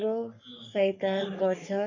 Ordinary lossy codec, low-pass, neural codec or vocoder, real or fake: AAC, 48 kbps; 7.2 kHz; codec, 44.1 kHz, 3.4 kbps, Pupu-Codec; fake